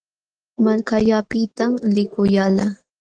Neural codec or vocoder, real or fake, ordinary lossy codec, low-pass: autoencoder, 48 kHz, 128 numbers a frame, DAC-VAE, trained on Japanese speech; fake; Opus, 16 kbps; 9.9 kHz